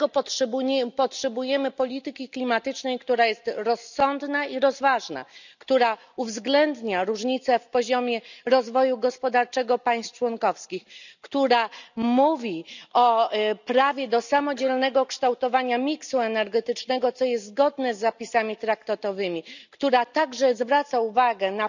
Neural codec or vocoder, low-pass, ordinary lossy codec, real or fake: none; 7.2 kHz; none; real